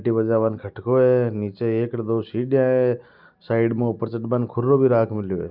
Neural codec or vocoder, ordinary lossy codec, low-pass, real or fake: none; Opus, 24 kbps; 5.4 kHz; real